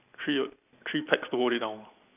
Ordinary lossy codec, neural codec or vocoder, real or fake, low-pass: none; none; real; 3.6 kHz